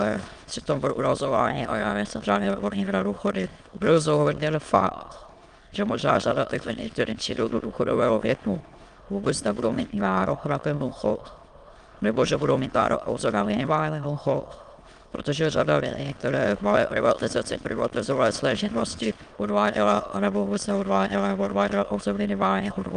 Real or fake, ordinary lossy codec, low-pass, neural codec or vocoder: fake; Opus, 32 kbps; 9.9 kHz; autoencoder, 22.05 kHz, a latent of 192 numbers a frame, VITS, trained on many speakers